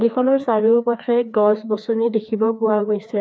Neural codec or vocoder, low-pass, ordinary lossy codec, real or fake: codec, 16 kHz, 2 kbps, FreqCodec, larger model; none; none; fake